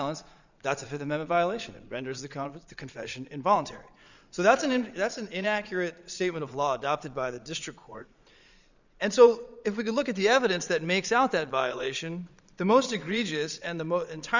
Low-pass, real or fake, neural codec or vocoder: 7.2 kHz; fake; vocoder, 22.05 kHz, 80 mel bands, Vocos